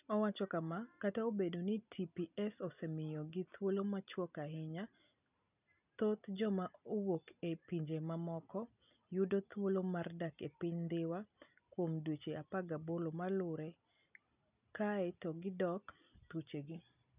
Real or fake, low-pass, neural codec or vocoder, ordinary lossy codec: real; 3.6 kHz; none; none